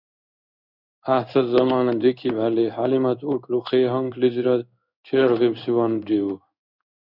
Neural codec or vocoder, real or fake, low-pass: codec, 16 kHz in and 24 kHz out, 1 kbps, XY-Tokenizer; fake; 5.4 kHz